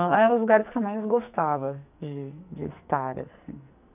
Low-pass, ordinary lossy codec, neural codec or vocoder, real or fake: 3.6 kHz; none; codec, 44.1 kHz, 2.6 kbps, SNAC; fake